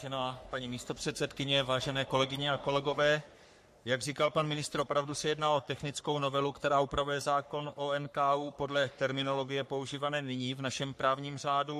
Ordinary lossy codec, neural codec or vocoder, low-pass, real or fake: MP3, 64 kbps; codec, 44.1 kHz, 3.4 kbps, Pupu-Codec; 14.4 kHz; fake